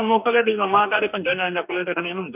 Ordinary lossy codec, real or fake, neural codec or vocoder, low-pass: none; fake; codec, 44.1 kHz, 2.6 kbps, DAC; 3.6 kHz